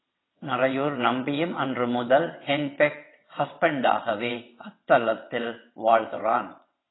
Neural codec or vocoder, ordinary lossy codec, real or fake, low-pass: vocoder, 22.05 kHz, 80 mel bands, WaveNeXt; AAC, 16 kbps; fake; 7.2 kHz